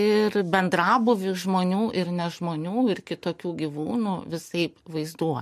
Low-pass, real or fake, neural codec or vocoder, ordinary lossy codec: 14.4 kHz; real; none; MP3, 64 kbps